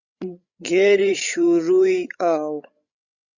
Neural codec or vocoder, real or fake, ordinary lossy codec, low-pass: codec, 16 kHz, 16 kbps, FreqCodec, larger model; fake; Opus, 64 kbps; 7.2 kHz